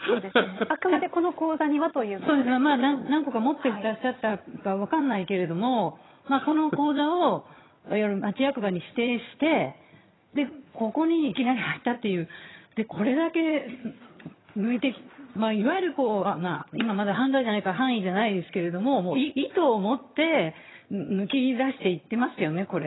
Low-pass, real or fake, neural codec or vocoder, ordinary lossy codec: 7.2 kHz; fake; vocoder, 22.05 kHz, 80 mel bands, HiFi-GAN; AAC, 16 kbps